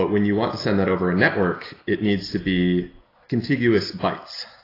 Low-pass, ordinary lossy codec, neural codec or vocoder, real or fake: 5.4 kHz; AAC, 24 kbps; none; real